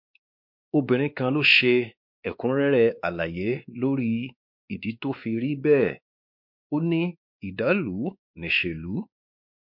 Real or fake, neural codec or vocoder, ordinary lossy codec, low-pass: fake; codec, 16 kHz, 2 kbps, X-Codec, WavLM features, trained on Multilingual LibriSpeech; MP3, 48 kbps; 5.4 kHz